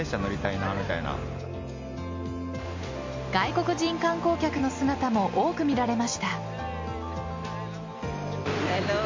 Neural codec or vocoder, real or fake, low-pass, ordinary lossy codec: none; real; 7.2 kHz; MP3, 48 kbps